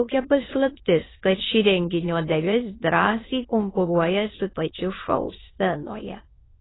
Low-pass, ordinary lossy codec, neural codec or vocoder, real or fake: 7.2 kHz; AAC, 16 kbps; autoencoder, 22.05 kHz, a latent of 192 numbers a frame, VITS, trained on many speakers; fake